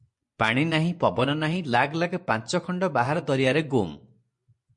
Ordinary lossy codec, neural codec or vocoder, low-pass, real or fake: MP3, 48 kbps; vocoder, 24 kHz, 100 mel bands, Vocos; 10.8 kHz; fake